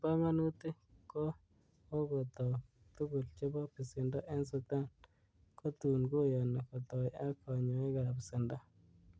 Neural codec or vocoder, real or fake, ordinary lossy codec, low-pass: none; real; none; none